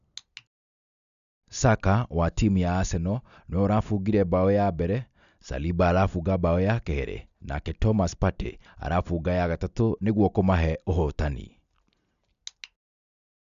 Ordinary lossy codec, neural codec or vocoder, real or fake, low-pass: none; none; real; 7.2 kHz